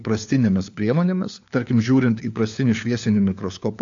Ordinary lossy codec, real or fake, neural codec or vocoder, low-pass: AAC, 64 kbps; fake; codec, 16 kHz, 4 kbps, FunCodec, trained on LibriTTS, 50 frames a second; 7.2 kHz